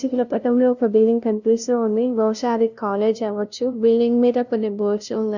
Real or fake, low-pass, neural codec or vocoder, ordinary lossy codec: fake; 7.2 kHz; codec, 16 kHz, 0.5 kbps, FunCodec, trained on LibriTTS, 25 frames a second; none